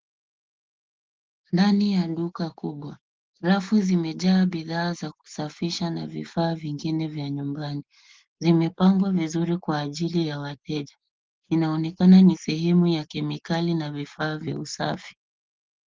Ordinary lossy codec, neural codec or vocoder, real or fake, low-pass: Opus, 16 kbps; none; real; 7.2 kHz